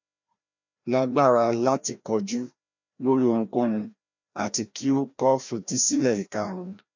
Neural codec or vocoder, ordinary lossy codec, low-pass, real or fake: codec, 16 kHz, 1 kbps, FreqCodec, larger model; MP3, 64 kbps; 7.2 kHz; fake